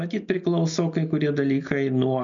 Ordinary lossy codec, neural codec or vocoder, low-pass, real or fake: MP3, 96 kbps; none; 7.2 kHz; real